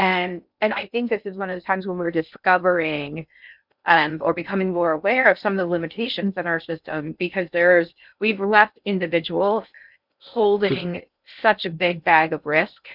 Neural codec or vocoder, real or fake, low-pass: codec, 16 kHz in and 24 kHz out, 0.6 kbps, FocalCodec, streaming, 2048 codes; fake; 5.4 kHz